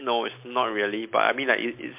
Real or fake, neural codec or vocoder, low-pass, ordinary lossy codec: real; none; 3.6 kHz; MP3, 32 kbps